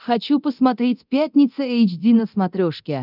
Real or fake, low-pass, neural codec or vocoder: fake; 5.4 kHz; codec, 24 kHz, 0.9 kbps, DualCodec